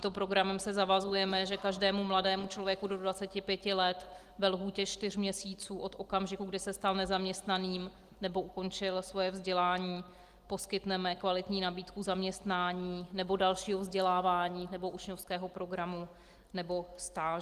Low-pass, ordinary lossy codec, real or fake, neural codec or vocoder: 14.4 kHz; Opus, 24 kbps; real; none